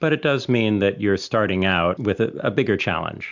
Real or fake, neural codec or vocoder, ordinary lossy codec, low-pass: real; none; MP3, 64 kbps; 7.2 kHz